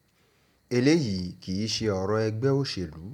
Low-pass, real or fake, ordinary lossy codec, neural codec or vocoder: 19.8 kHz; real; none; none